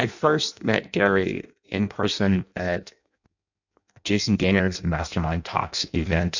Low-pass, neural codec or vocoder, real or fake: 7.2 kHz; codec, 16 kHz in and 24 kHz out, 0.6 kbps, FireRedTTS-2 codec; fake